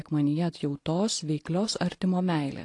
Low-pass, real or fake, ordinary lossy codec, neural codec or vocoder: 10.8 kHz; real; AAC, 48 kbps; none